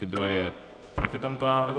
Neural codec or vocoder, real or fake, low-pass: codec, 24 kHz, 0.9 kbps, WavTokenizer, medium music audio release; fake; 9.9 kHz